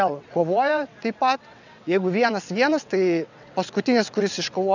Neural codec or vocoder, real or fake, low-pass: vocoder, 22.05 kHz, 80 mel bands, WaveNeXt; fake; 7.2 kHz